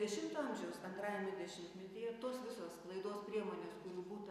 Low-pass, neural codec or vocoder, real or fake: 14.4 kHz; none; real